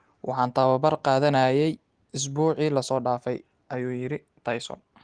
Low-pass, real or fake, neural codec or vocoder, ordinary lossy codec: 9.9 kHz; real; none; Opus, 24 kbps